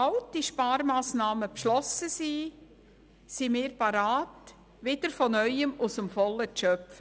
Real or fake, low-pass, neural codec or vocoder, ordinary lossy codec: real; none; none; none